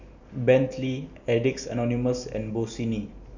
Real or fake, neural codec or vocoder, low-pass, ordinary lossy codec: real; none; 7.2 kHz; none